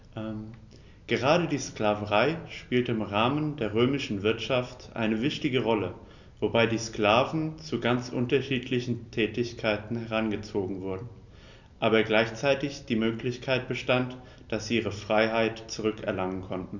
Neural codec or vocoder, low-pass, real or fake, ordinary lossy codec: none; 7.2 kHz; real; none